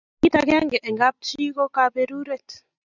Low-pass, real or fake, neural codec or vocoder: 7.2 kHz; real; none